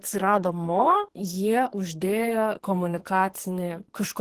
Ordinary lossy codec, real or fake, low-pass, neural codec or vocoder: Opus, 16 kbps; fake; 14.4 kHz; codec, 44.1 kHz, 2.6 kbps, SNAC